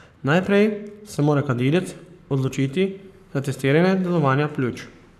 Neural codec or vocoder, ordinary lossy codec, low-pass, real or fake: codec, 44.1 kHz, 7.8 kbps, Pupu-Codec; none; 14.4 kHz; fake